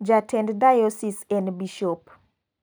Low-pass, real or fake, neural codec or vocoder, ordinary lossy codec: none; real; none; none